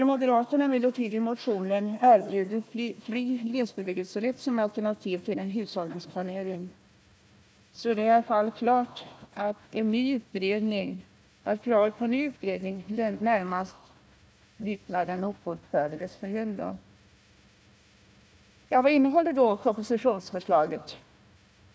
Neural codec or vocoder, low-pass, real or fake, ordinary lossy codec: codec, 16 kHz, 1 kbps, FunCodec, trained on Chinese and English, 50 frames a second; none; fake; none